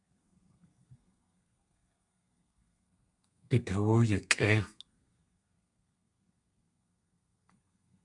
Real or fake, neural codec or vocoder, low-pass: fake; codec, 32 kHz, 1.9 kbps, SNAC; 10.8 kHz